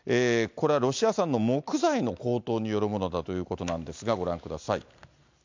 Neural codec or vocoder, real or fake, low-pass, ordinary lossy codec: none; real; 7.2 kHz; MP3, 64 kbps